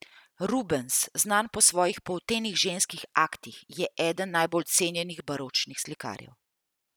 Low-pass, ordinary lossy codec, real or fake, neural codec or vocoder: none; none; real; none